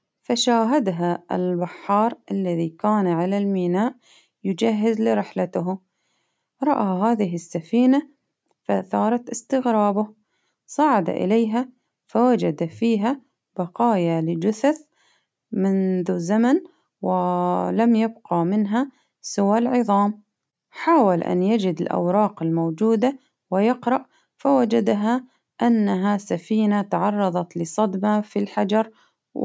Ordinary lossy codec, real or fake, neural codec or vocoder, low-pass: none; real; none; none